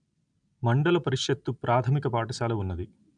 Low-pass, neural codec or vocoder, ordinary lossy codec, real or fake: 9.9 kHz; none; none; real